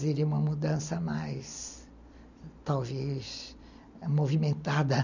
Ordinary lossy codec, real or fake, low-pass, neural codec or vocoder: none; real; 7.2 kHz; none